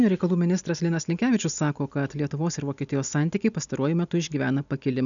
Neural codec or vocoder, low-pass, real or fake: none; 7.2 kHz; real